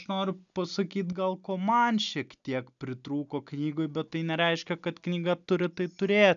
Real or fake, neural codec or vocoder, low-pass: real; none; 7.2 kHz